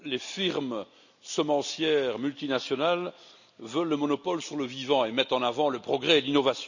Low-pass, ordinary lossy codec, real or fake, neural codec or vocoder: 7.2 kHz; none; real; none